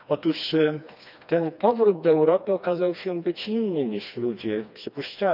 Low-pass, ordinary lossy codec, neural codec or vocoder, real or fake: 5.4 kHz; none; codec, 16 kHz, 2 kbps, FreqCodec, smaller model; fake